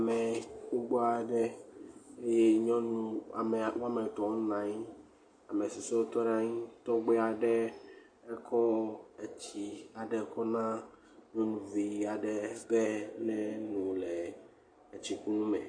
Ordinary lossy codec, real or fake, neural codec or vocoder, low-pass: MP3, 48 kbps; real; none; 9.9 kHz